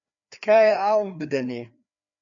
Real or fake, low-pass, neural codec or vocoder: fake; 7.2 kHz; codec, 16 kHz, 2 kbps, FreqCodec, larger model